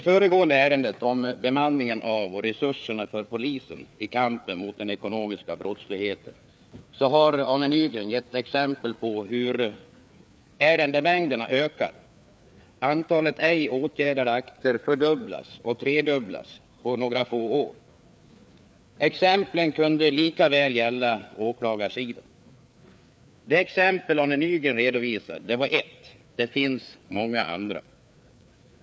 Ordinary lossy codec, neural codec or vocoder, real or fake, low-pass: none; codec, 16 kHz, 4 kbps, FreqCodec, larger model; fake; none